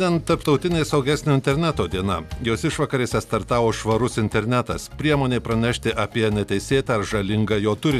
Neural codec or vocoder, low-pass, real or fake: none; 14.4 kHz; real